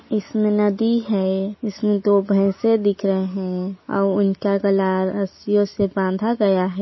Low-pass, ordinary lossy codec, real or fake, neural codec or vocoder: 7.2 kHz; MP3, 24 kbps; real; none